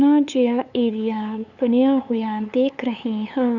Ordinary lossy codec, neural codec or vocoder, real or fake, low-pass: none; codec, 16 kHz, 8 kbps, FunCodec, trained on LibriTTS, 25 frames a second; fake; 7.2 kHz